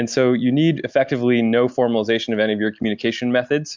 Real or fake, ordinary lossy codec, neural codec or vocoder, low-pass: real; MP3, 64 kbps; none; 7.2 kHz